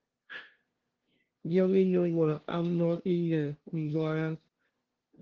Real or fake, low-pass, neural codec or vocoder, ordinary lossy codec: fake; 7.2 kHz; codec, 16 kHz, 0.5 kbps, FunCodec, trained on LibriTTS, 25 frames a second; Opus, 16 kbps